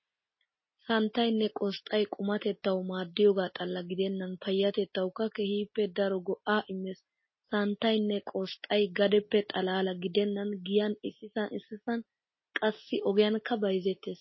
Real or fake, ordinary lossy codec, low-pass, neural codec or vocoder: real; MP3, 24 kbps; 7.2 kHz; none